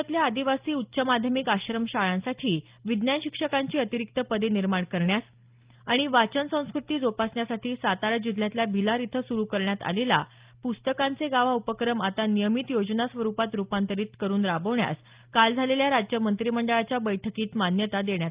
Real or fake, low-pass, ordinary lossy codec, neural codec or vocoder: real; 3.6 kHz; Opus, 32 kbps; none